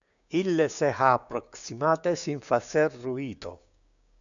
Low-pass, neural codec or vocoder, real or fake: 7.2 kHz; codec, 16 kHz, 6 kbps, DAC; fake